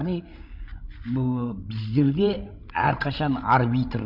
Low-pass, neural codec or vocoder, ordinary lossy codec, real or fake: 5.4 kHz; codec, 16 kHz, 16 kbps, FreqCodec, larger model; none; fake